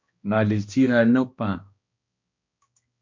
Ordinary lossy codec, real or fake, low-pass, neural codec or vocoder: MP3, 48 kbps; fake; 7.2 kHz; codec, 16 kHz, 1 kbps, X-Codec, HuBERT features, trained on balanced general audio